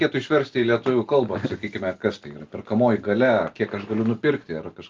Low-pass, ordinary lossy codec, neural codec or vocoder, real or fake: 7.2 kHz; Opus, 24 kbps; none; real